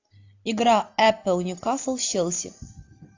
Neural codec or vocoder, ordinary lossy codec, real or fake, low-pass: none; AAC, 48 kbps; real; 7.2 kHz